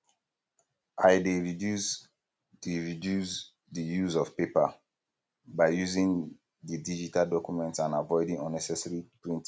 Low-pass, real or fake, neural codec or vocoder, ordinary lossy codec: none; real; none; none